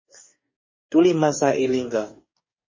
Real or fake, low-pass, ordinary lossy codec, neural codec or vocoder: fake; 7.2 kHz; MP3, 32 kbps; codec, 44.1 kHz, 2.6 kbps, DAC